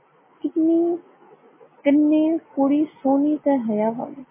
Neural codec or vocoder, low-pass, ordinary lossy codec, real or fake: none; 3.6 kHz; MP3, 16 kbps; real